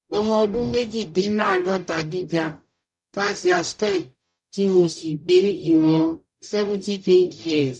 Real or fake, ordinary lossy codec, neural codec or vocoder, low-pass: fake; none; codec, 44.1 kHz, 0.9 kbps, DAC; 10.8 kHz